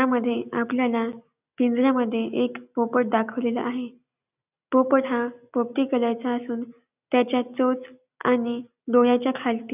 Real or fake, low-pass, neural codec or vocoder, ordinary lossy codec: real; 3.6 kHz; none; none